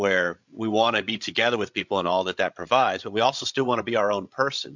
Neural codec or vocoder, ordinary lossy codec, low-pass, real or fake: codec, 16 kHz, 16 kbps, FreqCodec, larger model; MP3, 64 kbps; 7.2 kHz; fake